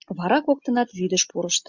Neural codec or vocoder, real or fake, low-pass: none; real; 7.2 kHz